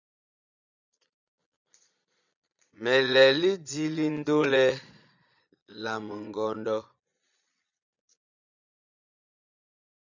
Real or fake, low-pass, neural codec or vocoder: fake; 7.2 kHz; vocoder, 22.05 kHz, 80 mel bands, Vocos